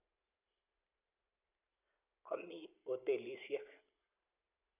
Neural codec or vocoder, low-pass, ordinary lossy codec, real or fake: none; 3.6 kHz; none; real